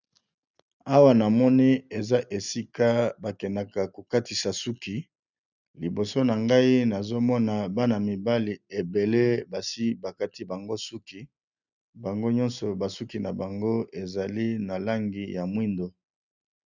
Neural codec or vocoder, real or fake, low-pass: none; real; 7.2 kHz